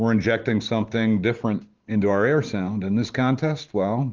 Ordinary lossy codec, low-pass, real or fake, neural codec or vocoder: Opus, 32 kbps; 7.2 kHz; fake; vocoder, 44.1 kHz, 128 mel bands every 512 samples, BigVGAN v2